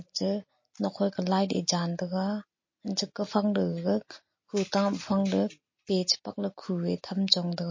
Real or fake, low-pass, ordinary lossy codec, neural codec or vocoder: real; 7.2 kHz; MP3, 32 kbps; none